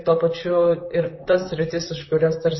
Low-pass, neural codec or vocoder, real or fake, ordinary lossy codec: 7.2 kHz; vocoder, 44.1 kHz, 128 mel bands, Pupu-Vocoder; fake; MP3, 24 kbps